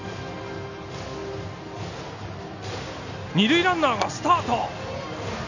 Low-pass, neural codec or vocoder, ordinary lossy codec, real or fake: 7.2 kHz; none; none; real